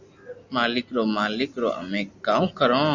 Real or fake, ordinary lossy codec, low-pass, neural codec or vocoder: real; Opus, 64 kbps; 7.2 kHz; none